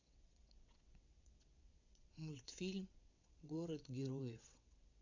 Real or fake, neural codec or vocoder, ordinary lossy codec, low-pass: fake; vocoder, 22.05 kHz, 80 mel bands, WaveNeXt; AAC, 48 kbps; 7.2 kHz